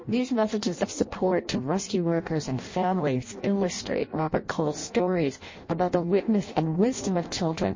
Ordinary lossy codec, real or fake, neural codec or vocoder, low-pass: MP3, 32 kbps; fake; codec, 16 kHz in and 24 kHz out, 0.6 kbps, FireRedTTS-2 codec; 7.2 kHz